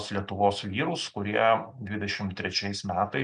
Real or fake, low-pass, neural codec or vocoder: real; 10.8 kHz; none